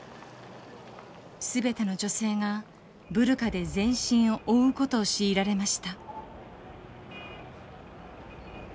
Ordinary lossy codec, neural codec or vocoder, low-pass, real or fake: none; none; none; real